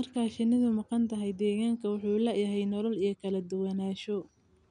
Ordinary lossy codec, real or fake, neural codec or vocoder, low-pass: none; real; none; 9.9 kHz